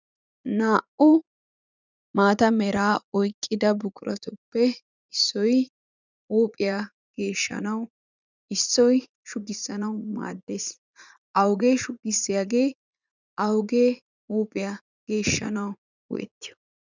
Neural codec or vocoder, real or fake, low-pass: vocoder, 44.1 kHz, 80 mel bands, Vocos; fake; 7.2 kHz